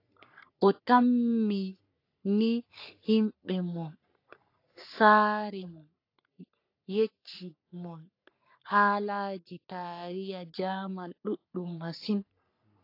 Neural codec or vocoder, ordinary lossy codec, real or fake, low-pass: codec, 44.1 kHz, 3.4 kbps, Pupu-Codec; AAC, 32 kbps; fake; 5.4 kHz